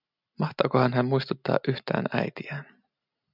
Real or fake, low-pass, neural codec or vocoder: real; 5.4 kHz; none